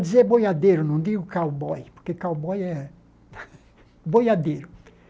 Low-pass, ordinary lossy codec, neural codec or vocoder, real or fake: none; none; none; real